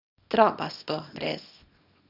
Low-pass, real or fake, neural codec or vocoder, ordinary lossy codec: 5.4 kHz; fake; codec, 24 kHz, 0.9 kbps, WavTokenizer, small release; none